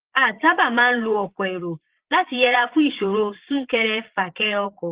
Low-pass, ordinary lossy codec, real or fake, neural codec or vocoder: 3.6 kHz; Opus, 16 kbps; fake; vocoder, 22.05 kHz, 80 mel bands, Vocos